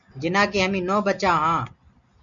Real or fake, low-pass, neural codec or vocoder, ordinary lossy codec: real; 7.2 kHz; none; MP3, 96 kbps